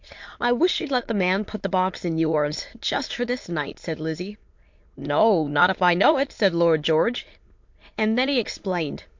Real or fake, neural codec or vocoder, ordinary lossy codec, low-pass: fake; autoencoder, 22.05 kHz, a latent of 192 numbers a frame, VITS, trained on many speakers; MP3, 48 kbps; 7.2 kHz